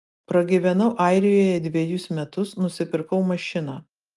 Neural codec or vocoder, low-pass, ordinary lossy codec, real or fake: none; 10.8 kHz; Opus, 32 kbps; real